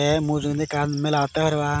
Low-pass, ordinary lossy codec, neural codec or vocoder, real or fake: none; none; none; real